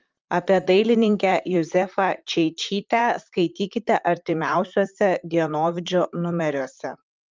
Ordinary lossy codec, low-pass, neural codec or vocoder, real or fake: Opus, 32 kbps; 7.2 kHz; vocoder, 44.1 kHz, 128 mel bands, Pupu-Vocoder; fake